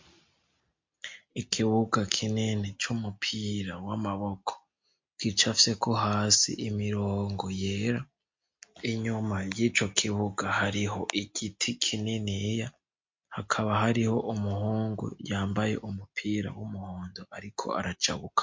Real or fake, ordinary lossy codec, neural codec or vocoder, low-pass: real; MP3, 48 kbps; none; 7.2 kHz